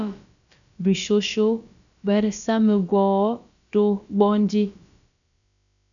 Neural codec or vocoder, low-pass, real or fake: codec, 16 kHz, about 1 kbps, DyCAST, with the encoder's durations; 7.2 kHz; fake